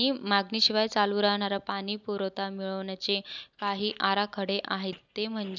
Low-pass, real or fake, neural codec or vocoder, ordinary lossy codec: 7.2 kHz; real; none; none